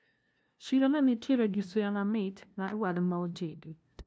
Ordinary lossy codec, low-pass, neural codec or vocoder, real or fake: none; none; codec, 16 kHz, 0.5 kbps, FunCodec, trained on LibriTTS, 25 frames a second; fake